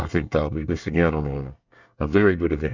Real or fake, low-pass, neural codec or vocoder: fake; 7.2 kHz; codec, 24 kHz, 1 kbps, SNAC